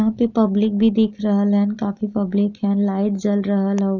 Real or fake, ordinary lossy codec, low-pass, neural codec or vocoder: real; none; 7.2 kHz; none